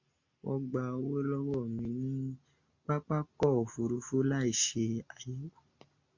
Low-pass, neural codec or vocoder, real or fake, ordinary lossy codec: 7.2 kHz; none; real; MP3, 48 kbps